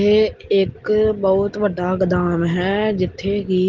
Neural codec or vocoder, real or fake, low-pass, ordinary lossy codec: none; real; 7.2 kHz; Opus, 16 kbps